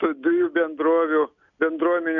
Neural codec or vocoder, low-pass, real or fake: none; 7.2 kHz; real